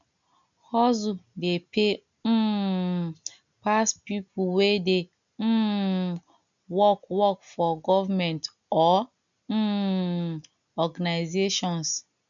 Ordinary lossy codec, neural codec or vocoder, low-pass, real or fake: none; none; 7.2 kHz; real